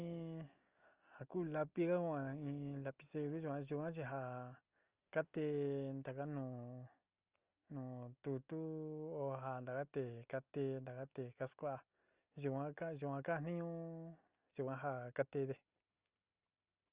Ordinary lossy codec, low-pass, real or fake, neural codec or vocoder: Opus, 24 kbps; 3.6 kHz; real; none